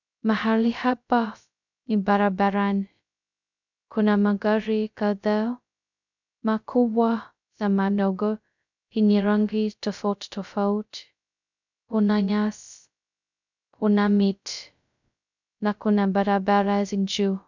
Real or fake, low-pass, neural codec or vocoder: fake; 7.2 kHz; codec, 16 kHz, 0.2 kbps, FocalCodec